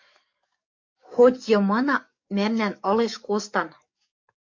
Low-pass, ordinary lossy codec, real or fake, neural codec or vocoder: 7.2 kHz; MP3, 48 kbps; fake; codec, 44.1 kHz, 7.8 kbps, DAC